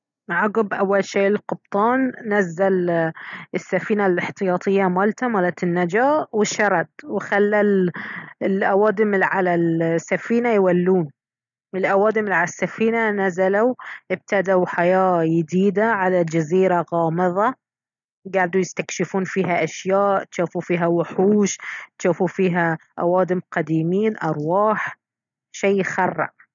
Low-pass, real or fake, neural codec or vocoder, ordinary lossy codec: 7.2 kHz; real; none; none